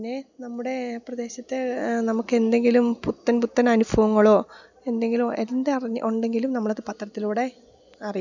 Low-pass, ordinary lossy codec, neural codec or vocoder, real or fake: 7.2 kHz; none; none; real